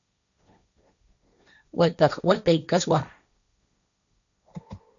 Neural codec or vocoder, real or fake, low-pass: codec, 16 kHz, 1.1 kbps, Voila-Tokenizer; fake; 7.2 kHz